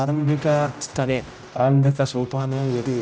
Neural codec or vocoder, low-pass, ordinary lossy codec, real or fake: codec, 16 kHz, 0.5 kbps, X-Codec, HuBERT features, trained on general audio; none; none; fake